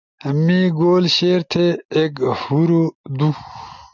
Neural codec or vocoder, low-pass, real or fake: none; 7.2 kHz; real